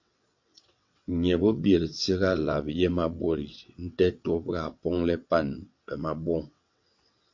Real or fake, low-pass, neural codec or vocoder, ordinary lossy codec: fake; 7.2 kHz; vocoder, 22.05 kHz, 80 mel bands, WaveNeXt; MP3, 48 kbps